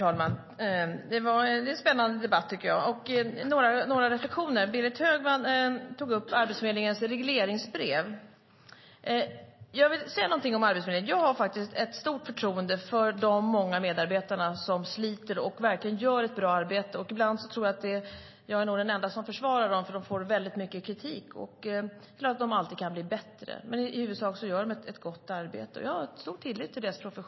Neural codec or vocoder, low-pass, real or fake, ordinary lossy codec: none; 7.2 kHz; real; MP3, 24 kbps